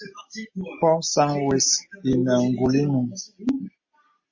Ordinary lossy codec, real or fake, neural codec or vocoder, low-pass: MP3, 32 kbps; real; none; 7.2 kHz